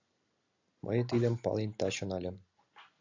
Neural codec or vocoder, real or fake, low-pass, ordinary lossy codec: none; real; 7.2 kHz; AAC, 48 kbps